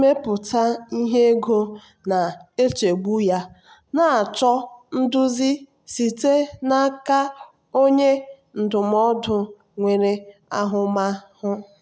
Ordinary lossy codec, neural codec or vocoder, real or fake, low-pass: none; none; real; none